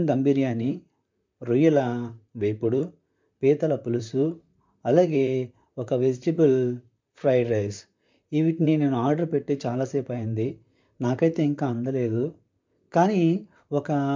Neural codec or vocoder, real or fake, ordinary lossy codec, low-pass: vocoder, 44.1 kHz, 128 mel bands, Pupu-Vocoder; fake; MP3, 64 kbps; 7.2 kHz